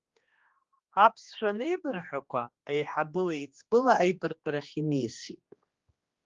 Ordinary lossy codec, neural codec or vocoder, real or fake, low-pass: Opus, 32 kbps; codec, 16 kHz, 2 kbps, X-Codec, HuBERT features, trained on general audio; fake; 7.2 kHz